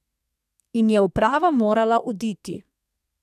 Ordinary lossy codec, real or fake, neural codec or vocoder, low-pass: none; fake; codec, 32 kHz, 1.9 kbps, SNAC; 14.4 kHz